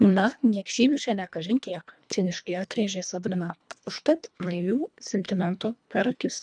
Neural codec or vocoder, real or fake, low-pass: codec, 24 kHz, 1.5 kbps, HILCodec; fake; 9.9 kHz